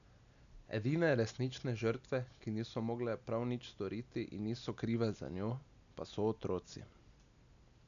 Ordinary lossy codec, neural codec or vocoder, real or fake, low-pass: none; none; real; 7.2 kHz